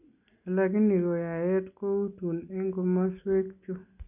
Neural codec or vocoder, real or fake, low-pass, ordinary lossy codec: none; real; 3.6 kHz; none